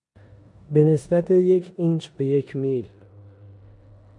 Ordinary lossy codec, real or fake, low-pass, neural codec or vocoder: MP3, 96 kbps; fake; 10.8 kHz; codec, 16 kHz in and 24 kHz out, 0.9 kbps, LongCat-Audio-Codec, four codebook decoder